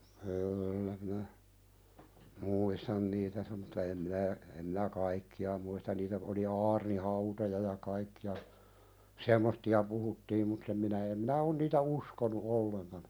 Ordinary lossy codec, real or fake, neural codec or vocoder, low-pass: none; real; none; none